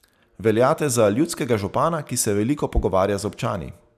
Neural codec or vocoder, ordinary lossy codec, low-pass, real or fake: none; none; 14.4 kHz; real